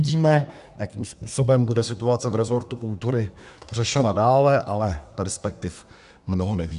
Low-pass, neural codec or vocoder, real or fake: 10.8 kHz; codec, 24 kHz, 1 kbps, SNAC; fake